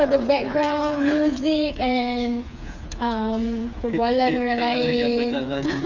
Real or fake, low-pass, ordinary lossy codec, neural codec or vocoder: fake; 7.2 kHz; none; codec, 16 kHz, 4 kbps, FreqCodec, smaller model